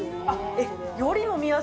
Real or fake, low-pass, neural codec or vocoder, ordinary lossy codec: real; none; none; none